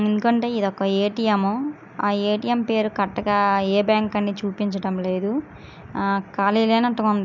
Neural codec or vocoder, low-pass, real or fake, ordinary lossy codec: none; 7.2 kHz; real; none